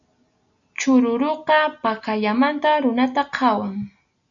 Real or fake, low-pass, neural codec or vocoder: real; 7.2 kHz; none